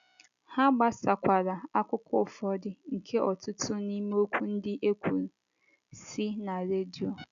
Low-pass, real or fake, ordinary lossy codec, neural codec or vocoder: 7.2 kHz; real; none; none